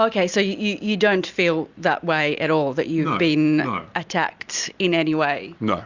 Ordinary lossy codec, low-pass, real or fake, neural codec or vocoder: Opus, 64 kbps; 7.2 kHz; real; none